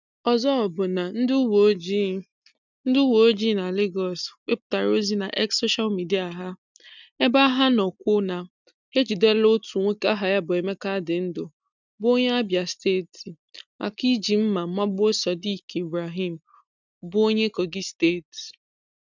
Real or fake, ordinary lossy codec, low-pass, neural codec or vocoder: real; none; 7.2 kHz; none